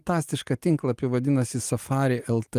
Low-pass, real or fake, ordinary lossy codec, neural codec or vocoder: 14.4 kHz; real; Opus, 32 kbps; none